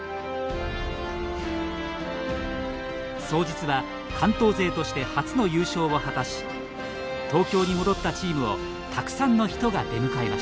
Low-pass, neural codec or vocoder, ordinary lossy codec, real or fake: none; none; none; real